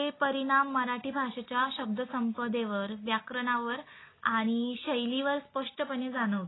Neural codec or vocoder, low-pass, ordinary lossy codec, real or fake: none; 7.2 kHz; AAC, 16 kbps; real